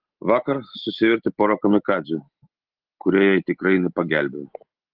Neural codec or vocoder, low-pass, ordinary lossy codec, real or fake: none; 5.4 kHz; Opus, 16 kbps; real